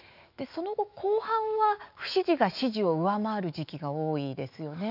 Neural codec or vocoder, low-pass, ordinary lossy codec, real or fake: autoencoder, 48 kHz, 128 numbers a frame, DAC-VAE, trained on Japanese speech; 5.4 kHz; none; fake